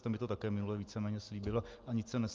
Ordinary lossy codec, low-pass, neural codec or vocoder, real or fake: Opus, 32 kbps; 7.2 kHz; none; real